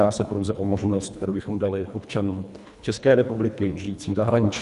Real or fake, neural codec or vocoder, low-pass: fake; codec, 24 kHz, 1.5 kbps, HILCodec; 10.8 kHz